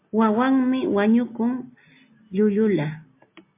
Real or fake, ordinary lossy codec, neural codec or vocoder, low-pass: real; MP3, 24 kbps; none; 3.6 kHz